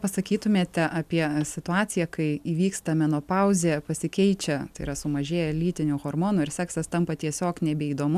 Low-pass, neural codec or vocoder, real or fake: 14.4 kHz; none; real